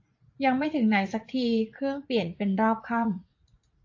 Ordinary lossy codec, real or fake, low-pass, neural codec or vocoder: AAC, 48 kbps; fake; 7.2 kHz; vocoder, 44.1 kHz, 80 mel bands, Vocos